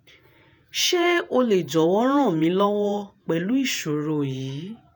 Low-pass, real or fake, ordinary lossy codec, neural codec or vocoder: none; fake; none; vocoder, 48 kHz, 128 mel bands, Vocos